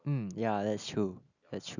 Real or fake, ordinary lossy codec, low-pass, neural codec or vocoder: real; none; 7.2 kHz; none